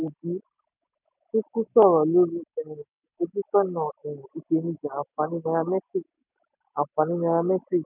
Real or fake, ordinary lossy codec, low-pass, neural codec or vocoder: real; none; 3.6 kHz; none